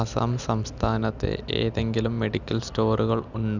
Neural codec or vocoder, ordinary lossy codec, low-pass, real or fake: none; none; 7.2 kHz; real